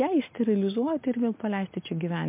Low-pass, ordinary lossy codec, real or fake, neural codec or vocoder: 3.6 kHz; MP3, 32 kbps; real; none